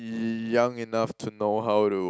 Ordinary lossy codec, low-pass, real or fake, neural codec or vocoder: none; none; real; none